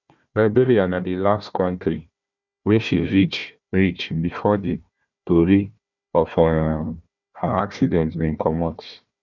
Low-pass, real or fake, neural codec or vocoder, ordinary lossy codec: 7.2 kHz; fake; codec, 16 kHz, 1 kbps, FunCodec, trained on Chinese and English, 50 frames a second; none